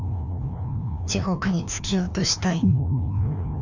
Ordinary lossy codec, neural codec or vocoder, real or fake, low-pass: none; codec, 16 kHz, 1 kbps, FreqCodec, larger model; fake; 7.2 kHz